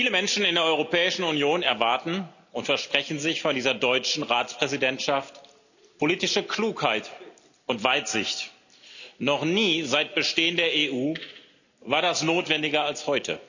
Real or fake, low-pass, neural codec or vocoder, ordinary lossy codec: real; 7.2 kHz; none; none